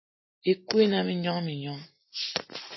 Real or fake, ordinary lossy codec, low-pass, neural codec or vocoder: real; MP3, 24 kbps; 7.2 kHz; none